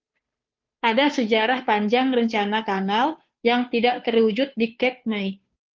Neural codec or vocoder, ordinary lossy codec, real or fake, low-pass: codec, 16 kHz, 2 kbps, FunCodec, trained on Chinese and English, 25 frames a second; Opus, 32 kbps; fake; 7.2 kHz